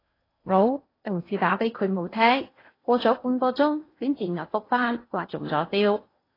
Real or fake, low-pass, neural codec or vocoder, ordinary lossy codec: fake; 5.4 kHz; codec, 16 kHz in and 24 kHz out, 0.8 kbps, FocalCodec, streaming, 65536 codes; AAC, 24 kbps